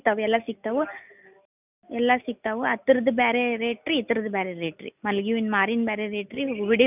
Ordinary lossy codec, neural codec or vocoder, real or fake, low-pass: none; none; real; 3.6 kHz